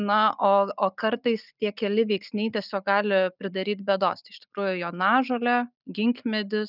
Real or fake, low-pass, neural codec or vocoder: real; 5.4 kHz; none